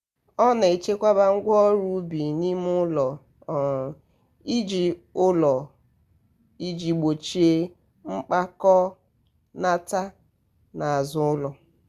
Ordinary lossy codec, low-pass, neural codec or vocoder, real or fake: Opus, 64 kbps; 14.4 kHz; none; real